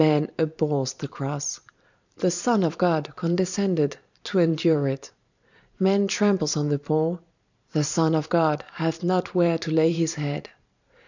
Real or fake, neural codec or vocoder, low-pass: fake; vocoder, 44.1 kHz, 128 mel bands every 512 samples, BigVGAN v2; 7.2 kHz